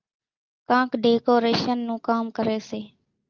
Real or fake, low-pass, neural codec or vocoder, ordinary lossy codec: real; 7.2 kHz; none; Opus, 24 kbps